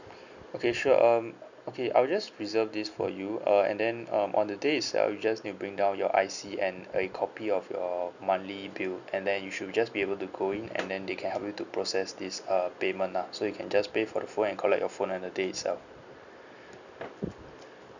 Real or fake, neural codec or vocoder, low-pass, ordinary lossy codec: real; none; 7.2 kHz; none